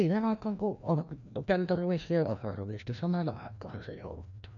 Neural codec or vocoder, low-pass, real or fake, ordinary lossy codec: codec, 16 kHz, 1 kbps, FreqCodec, larger model; 7.2 kHz; fake; none